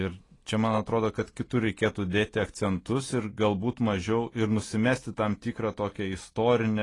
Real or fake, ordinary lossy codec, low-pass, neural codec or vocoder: real; AAC, 32 kbps; 10.8 kHz; none